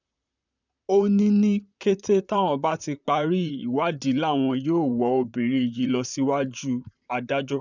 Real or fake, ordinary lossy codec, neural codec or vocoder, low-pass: fake; none; vocoder, 44.1 kHz, 128 mel bands, Pupu-Vocoder; 7.2 kHz